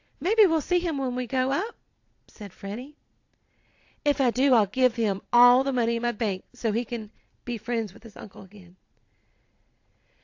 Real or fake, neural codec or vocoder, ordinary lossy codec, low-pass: fake; vocoder, 22.05 kHz, 80 mel bands, Vocos; AAC, 48 kbps; 7.2 kHz